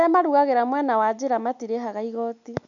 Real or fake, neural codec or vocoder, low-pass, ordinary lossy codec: real; none; 7.2 kHz; none